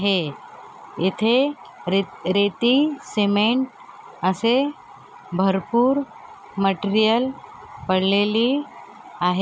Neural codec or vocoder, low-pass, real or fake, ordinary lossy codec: none; none; real; none